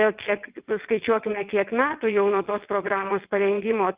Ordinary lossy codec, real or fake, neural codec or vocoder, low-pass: Opus, 32 kbps; fake; vocoder, 22.05 kHz, 80 mel bands, WaveNeXt; 3.6 kHz